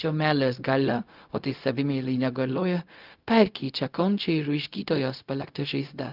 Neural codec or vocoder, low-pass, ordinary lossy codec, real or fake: codec, 16 kHz, 0.4 kbps, LongCat-Audio-Codec; 5.4 kHz; Opus, 24 kbps; fake